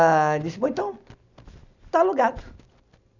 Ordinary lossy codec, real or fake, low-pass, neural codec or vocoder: none; real; 7.2 kHz; none